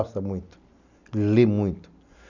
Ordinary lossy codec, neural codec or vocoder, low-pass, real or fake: none; none; 7.2 kHz; real